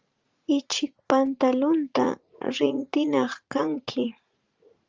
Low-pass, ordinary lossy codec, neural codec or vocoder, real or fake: 7.2 kHz; Opus, 32 kbps; vocoder, 44.1 kHz, 80 mel bands, Vocos; fake